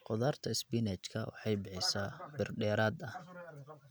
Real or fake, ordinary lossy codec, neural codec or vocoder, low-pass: real; none; none; none